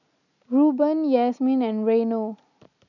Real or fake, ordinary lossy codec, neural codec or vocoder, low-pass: real; none; none; 7.2 kHz